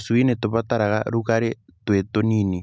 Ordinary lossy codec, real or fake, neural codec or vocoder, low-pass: none; real; none; none